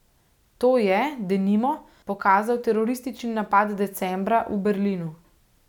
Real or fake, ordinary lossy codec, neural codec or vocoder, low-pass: real; none; none; 19.8 kHz